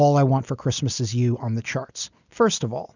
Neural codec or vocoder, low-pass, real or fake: none; 7.2 kHz; real